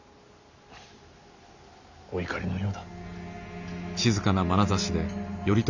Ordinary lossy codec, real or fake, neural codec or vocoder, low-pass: none; real; none; 7.2 kHz